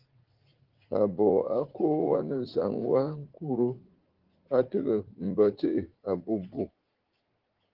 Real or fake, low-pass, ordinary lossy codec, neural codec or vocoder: fake; 5.4 kHz; Opus, 16 kbps; vocoder, 44.1 kHz, 80 mel bands, Vocos